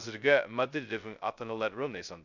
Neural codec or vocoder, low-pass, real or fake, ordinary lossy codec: codec, 16 kHz, 0.2 kbps, FocalCodec; 7.2 kHz; fake; none